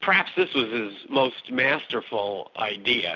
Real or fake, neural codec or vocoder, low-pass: real; none; 7.2 kHz